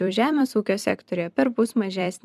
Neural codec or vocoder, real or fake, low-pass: vocoder, 44.1 kHz, 128 mel bands every 256 samples, BigVGAN v2; fake; 14.4 kHz